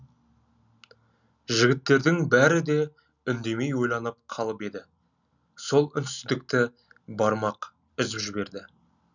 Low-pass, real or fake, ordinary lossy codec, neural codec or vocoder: 7.2 kHz; real; none; none